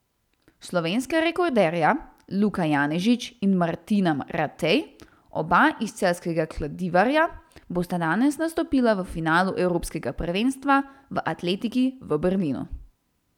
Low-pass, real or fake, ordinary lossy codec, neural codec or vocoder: 19.8 kHz; real; none; none